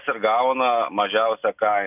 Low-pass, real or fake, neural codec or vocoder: 3.6 kHz; real; none